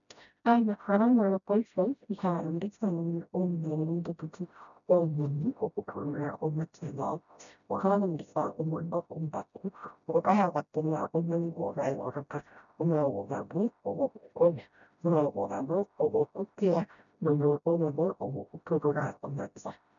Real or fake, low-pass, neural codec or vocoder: fake; 7.2 kHz; codec, 16 kHz, 0.5 kbps, FreqCodec, smaller model